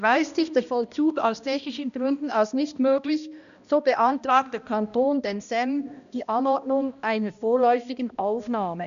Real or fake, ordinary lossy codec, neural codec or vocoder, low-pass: fake; none; codec, 16 kHz, 1 kbps, X-Codec, HuBERT features, trained on balanced general audio; 7.2 kHz